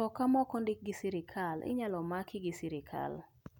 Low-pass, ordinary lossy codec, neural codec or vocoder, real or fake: 19.8 kHz; none; none; real